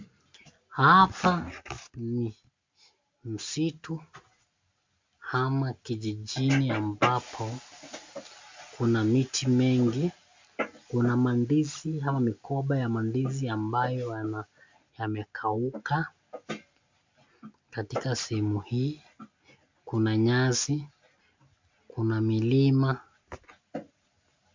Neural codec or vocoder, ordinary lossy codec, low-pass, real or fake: none; MP3, 64 kbps; 7.2 kHz; real